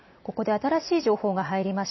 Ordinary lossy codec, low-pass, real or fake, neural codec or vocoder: MP3, 24 kbps; 7.2 kHz; real; none